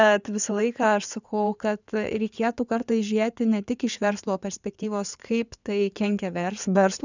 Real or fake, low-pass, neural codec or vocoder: fake; 7.2 kHz; codec, 16 kHz in and 24 kHz out, 2.2 kbps, FireRedTTS-2 codec